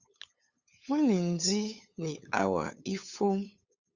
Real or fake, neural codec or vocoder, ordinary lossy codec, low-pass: fake; codec, 16 kHz, 8 kbps, FunCodec, trained on LibriTTS, 25 frames a second; Opus, 64 kbps; 7.2 kHz